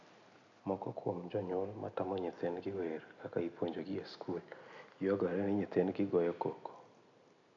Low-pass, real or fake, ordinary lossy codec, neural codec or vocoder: 7.2 kHz; real; none; none